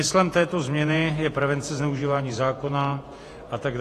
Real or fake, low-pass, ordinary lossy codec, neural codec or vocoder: fake; 14.4 kHz; AAC, 48 kbps; vocoder, 48 kHz, 128 mel bands, Vocos